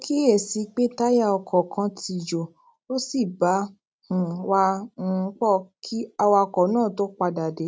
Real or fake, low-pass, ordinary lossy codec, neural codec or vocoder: real; none; none; none